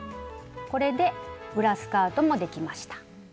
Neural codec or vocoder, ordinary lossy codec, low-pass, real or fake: none; none; none; real